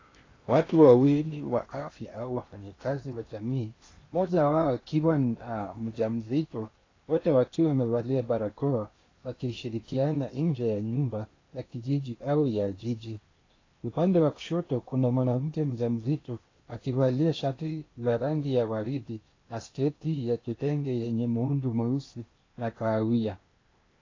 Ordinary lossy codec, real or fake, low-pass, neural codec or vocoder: AAC, 32 kbps; fake; 7.2 kHz; codec, 16 kHz in and 24 kHz out, 0.8 kbps, FocalCodec, streaming, 65536 codes